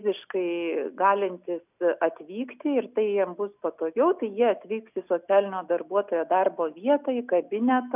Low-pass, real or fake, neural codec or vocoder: 3.6 kHz; real; none